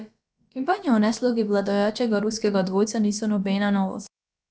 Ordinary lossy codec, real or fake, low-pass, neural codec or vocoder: none; fake; none; codec, 16 kHz, about 1 kbps, DyCAST, with the encoder's durations